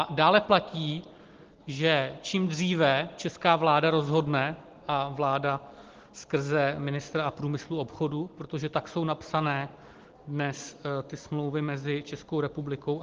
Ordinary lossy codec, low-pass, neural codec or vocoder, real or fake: Opus, 16 kbps; 7.2 kHz; none; real